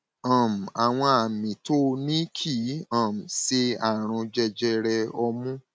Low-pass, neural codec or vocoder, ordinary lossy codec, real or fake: none; none; none; real